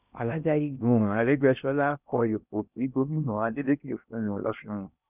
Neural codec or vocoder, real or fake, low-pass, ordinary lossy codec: codec, 16 kHz in and 24 kHz out, 0.6 kbps, FocalCodec, streaming, 2048 codes; fake; 3.6 kHz; none